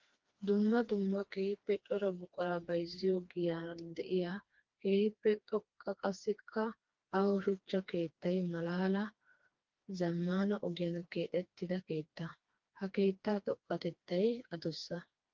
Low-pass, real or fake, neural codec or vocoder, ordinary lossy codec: 7.2 kHz; fake; codec, 16 kHz, 2 kbps, FreqCodec, smaller model; Opus, 32 kbps